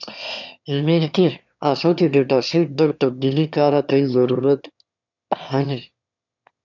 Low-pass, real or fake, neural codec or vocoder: 7.2 kHz; fake; autoencoder, 22.05 kHz, a latent of 192 numbers a frame, VITS, trained on one speaker